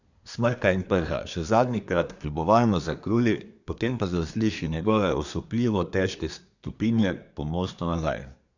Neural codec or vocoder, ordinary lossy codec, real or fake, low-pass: codec, 24 kHz, 1 kbps, SNAC; none; fake; 7.2 kHz